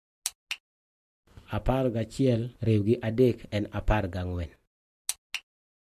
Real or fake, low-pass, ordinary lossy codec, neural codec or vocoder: real; 14.4 kHz; MP3, 64 kbps; none